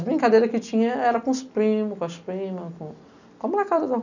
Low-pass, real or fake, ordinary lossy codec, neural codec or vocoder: 7.2 kHz; real; none; none